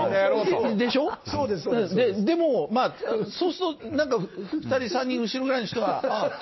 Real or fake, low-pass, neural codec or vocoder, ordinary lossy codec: real; 7.2 kHz; none; MP3, 24 kbps